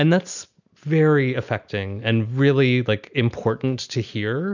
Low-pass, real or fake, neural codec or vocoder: 7.2 kHz; real; none